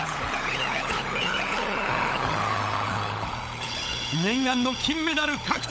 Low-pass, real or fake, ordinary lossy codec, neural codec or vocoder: none; fake; none; codec, 16 kHz, 16 kbps, FunCodec, trained on LibriTTS, 50 frames a second